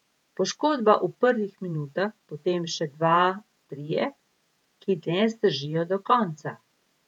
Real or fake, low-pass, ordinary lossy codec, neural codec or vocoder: fake; 19.8 kHz; none; vocoder, 48 kHz, 128 mel bands, Vocos